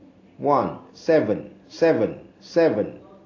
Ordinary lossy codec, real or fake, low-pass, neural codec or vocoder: AAC, 32 kbps; real; 7.2 kHz; none